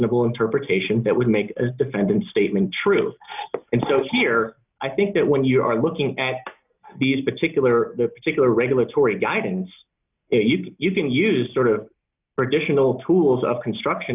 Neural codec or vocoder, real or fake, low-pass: none; real; 3.6 kHz